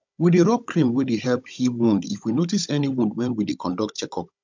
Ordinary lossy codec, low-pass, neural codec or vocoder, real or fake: MP3, 64 kbps; 7.2 kHz; codec, 16 kHz, 16 kbps, FunCodec, trained on Chinese and English, 50 frames a second; fake